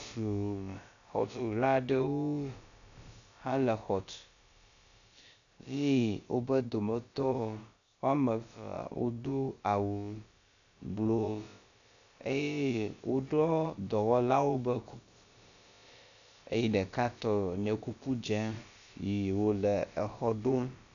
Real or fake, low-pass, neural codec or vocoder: fake; 7.2 kHz; codec, 16 kHz, about 1 kbps, DyCAST, with the encoder's durations